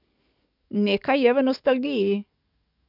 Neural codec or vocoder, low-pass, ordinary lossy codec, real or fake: codec, 44.1 kHz, 7.8 kbps, DAC; 5.4 kHz; none; fake